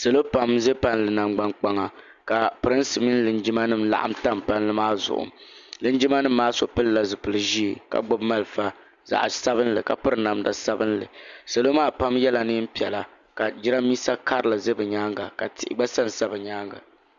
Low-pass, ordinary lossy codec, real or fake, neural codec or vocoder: 7.2 kHz; Opus, 64 kbps; real; none